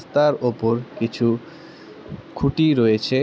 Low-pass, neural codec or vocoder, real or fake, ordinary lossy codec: none; none; real; none